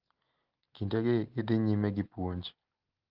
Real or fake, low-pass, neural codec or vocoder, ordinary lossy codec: real; 5.4 kHz; none; Opus, 16 kbps